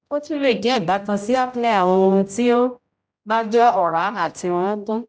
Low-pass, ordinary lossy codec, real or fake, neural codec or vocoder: none; none; fake; codec, 16 kHz, 0.5 kbps, X-Codec, HuBERT features, trained on general audio